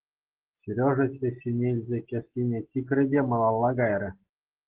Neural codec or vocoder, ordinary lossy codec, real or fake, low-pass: none; Opus, 16 kbps; real; 3.6 kHz